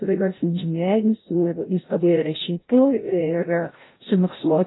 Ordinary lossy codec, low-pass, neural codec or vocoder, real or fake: AAC, 16 kbps; 7.2 kHz; codec, 16 kHz, 0.5 kbps, FreqCodec, larger model; fake